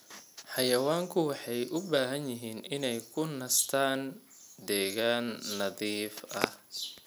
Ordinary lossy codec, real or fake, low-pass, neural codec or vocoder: none; real; none; none